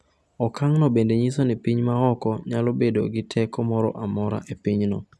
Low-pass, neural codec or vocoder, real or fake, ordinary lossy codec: none; none; real; none